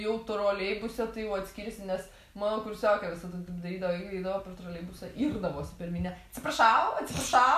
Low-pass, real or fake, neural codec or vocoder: 14.4 kHz; real; none